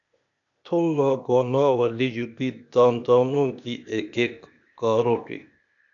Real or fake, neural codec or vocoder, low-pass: fake; codec, 16 kHz, 0.8 kbps, ZipCodec; 7.2 kHz